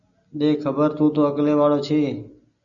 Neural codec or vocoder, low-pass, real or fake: none; 7.2 kHz; real